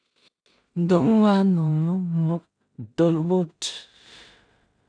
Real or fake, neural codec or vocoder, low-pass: fake; codec, 16 kHz in and 24 kHz out, 0.4 kbps, LongCat-Audio-Codec, two codebook decoder; 9.9 kHz